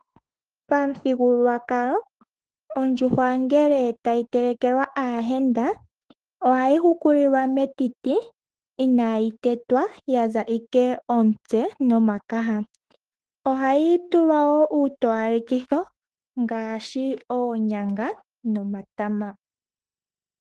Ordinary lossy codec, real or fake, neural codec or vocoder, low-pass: Opus, 16 kbps; fake; autoencoder, 48 kHz, 32 numbers a frame, DAC-VAE, trained on Japanese speech; 10.8 kHz